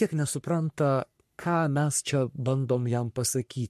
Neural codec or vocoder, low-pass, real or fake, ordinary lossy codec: codec, 44.1 kHz, 3.4 kbps, Pupu-Codec; 14.4 kHz; fake; MP3, 64 kbps